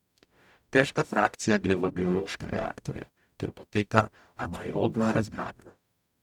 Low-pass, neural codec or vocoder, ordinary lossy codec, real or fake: 19.8 kHz; codec, 44.1 kHz, 0.9 kbps, DAC; none; fake